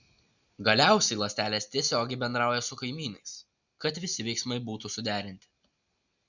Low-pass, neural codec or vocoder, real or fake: 7.2 kHz; none; real